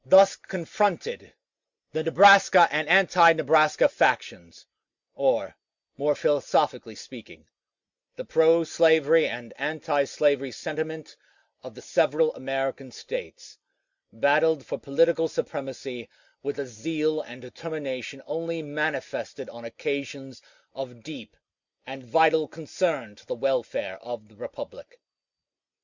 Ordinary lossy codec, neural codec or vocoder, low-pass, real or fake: Opus, 64 kbps; none; 7.2 kHz; real